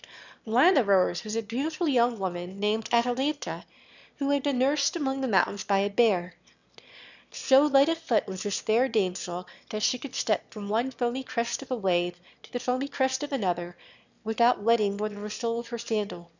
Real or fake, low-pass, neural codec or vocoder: fake; 7.2 kHz; autoencoder, 22.05 kHz, a latent of 192 numbers a frame, VITS, trained on one speaker